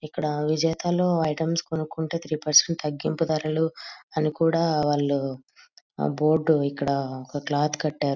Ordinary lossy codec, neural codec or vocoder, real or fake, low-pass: none; none; real; 7.2 kHz